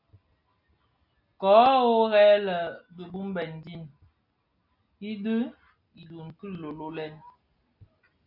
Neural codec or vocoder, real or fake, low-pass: none; real; 5.4 kHz